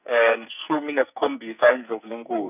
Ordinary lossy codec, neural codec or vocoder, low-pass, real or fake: none; codec, 44.1 kHz, 2.6 kbps, SNAC; 3.6 kHz; fake